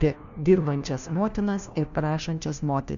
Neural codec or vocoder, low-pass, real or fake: codec, 16 kHz, 1 kbps, FunCodec, trained on LibriTTS, 50 frames a second; 7.2 kHz; fake